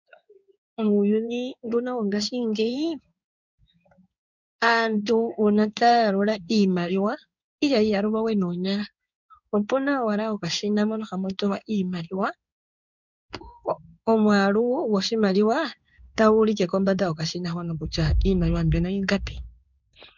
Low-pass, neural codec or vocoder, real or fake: 7.2 kHz; codec, 16 kHz in and 24 kHz out, 1 kbps, XY-Tokenizer; fake